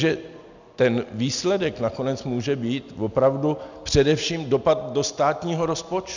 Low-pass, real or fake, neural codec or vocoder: 7.2 kHz; real; none